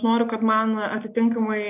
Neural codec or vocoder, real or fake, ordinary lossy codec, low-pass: none; real; AAC, 32 kbps; 3.6 kHz